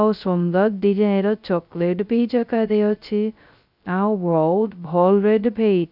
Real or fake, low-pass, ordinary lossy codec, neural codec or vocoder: fake; 5.4 kHz; none; codec, 16 kHz, 0.2 kbps, FocalCodec